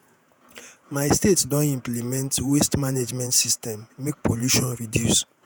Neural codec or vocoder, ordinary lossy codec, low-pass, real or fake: vocoder, 48 kHz, 128 mel bands, Vocos; none; none; fake